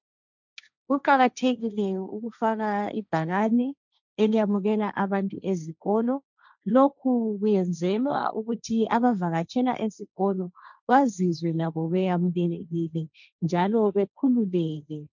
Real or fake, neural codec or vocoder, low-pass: fake; codec, 16 kHz, 1.1 kbps, Voila-Tokenizer; 7.2 kHz